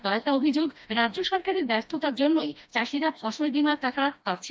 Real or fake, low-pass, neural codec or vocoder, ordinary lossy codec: fake; none; codec, 16 kHz, 1 kbps, FreqCodec, smaller model; none